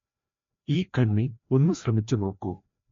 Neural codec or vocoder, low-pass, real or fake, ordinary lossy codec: codec, 16 kHz, 1 kbps, FreqCodec, larger model; 7.2 kHz; fake; MP3, 48 kbps